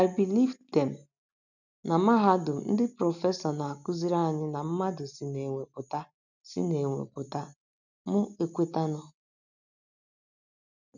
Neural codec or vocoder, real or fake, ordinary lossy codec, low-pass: none; real; none; 7.2 kHz